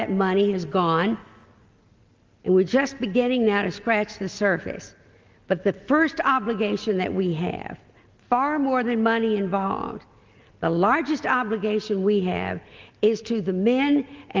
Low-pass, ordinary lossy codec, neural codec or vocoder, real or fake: 7.2 kHz; Opus, 32 kbps; none; real